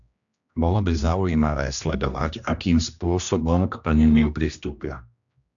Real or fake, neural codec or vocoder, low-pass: fake; codec, 16 kHz, 1 kbps, X-Codec, HuBERT features, trained on general audio; 7.2 kHz